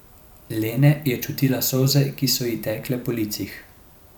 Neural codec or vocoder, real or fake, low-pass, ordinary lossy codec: vocoder, 44.1 kHz, 128 mel bands every 512 samples, BigVGAN v2; fake; none; none